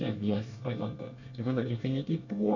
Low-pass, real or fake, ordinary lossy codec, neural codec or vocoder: 7.2 kHz; fake; none; codec, 24 kHz, 1 kbps, SNAC